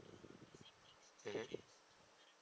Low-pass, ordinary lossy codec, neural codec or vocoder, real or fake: none; none; none; real